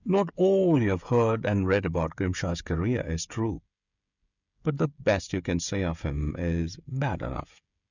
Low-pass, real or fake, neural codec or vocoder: 7.2 kHz; fake; codec, 16 kHz, 16 kbps, FreqCodec, smaller model